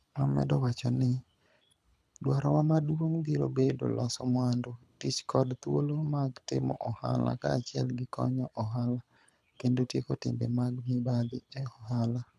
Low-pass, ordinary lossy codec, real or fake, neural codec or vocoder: none; none; fake; codec, 24 kHz, 6 kbps, HILCodec